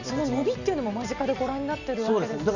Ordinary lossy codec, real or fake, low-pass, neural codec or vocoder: none; real; 7.2 kHz; none